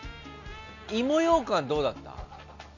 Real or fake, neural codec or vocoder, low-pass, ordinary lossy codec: real; none; 7.2 kHz; none